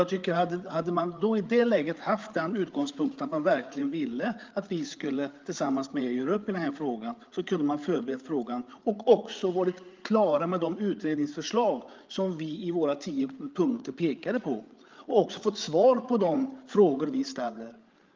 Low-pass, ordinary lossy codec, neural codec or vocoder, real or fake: 7.2 kHz; Opus, 24 kbps; codec, 16 kHz, 8 kbps, FreqCodec, larger model; fake